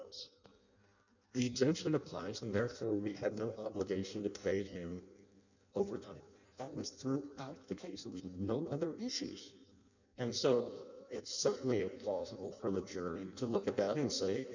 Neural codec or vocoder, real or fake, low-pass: codec, 16 kHz in and 24 kHz out, 0.6 kbps, FireRedTTS-2 codec; fake; 7.2 kHz